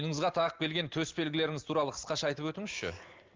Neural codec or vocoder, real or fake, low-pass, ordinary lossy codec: none; real; 7.2 kHz; Opus, 32 kbps